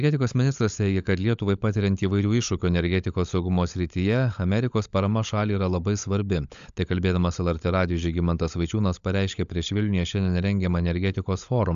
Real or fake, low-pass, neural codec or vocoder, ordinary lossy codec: fake; 7.2 kHz; codec, 16 kHz, 16 kbps, FunCodec, trained on LibriTTS, 50 frames a second; AAC, 96 kbps